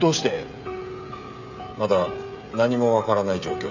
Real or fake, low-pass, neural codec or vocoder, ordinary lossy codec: fake; 7.2 kHz; codec, 16 kHz, 16 kbps, FreqCodec, smaller model; none